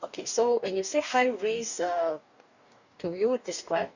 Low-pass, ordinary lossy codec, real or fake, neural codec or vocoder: 7.2 kHz; none; fake; codec, 44.1 kHz, 2.6 kbps, DAC